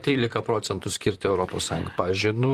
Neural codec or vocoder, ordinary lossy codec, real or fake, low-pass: vocoder, 44.1 kHz, 128 mel bands, Pupu-Vocoder; Opus, 24 kbps; fake; 14.4 kHz